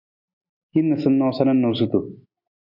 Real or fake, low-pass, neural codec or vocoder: real; 5.4 kHz; none